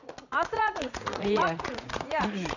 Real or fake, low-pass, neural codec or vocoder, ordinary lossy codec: fake; 7.2 kHz; vocoder, 44.1 kHz, 80 mel bands, Vocos; none